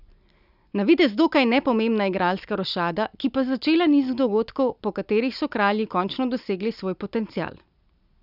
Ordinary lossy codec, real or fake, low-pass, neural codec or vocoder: none; real; 5.4 kHz; none